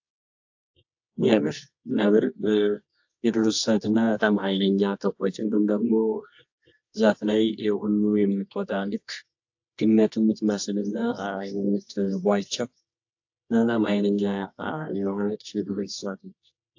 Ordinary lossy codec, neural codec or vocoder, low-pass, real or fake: AAC, 48 kbps; codec, 24 kHz, 0.9 kbps, WavTokenizer, medium music audio release; 7.2 kHz; fake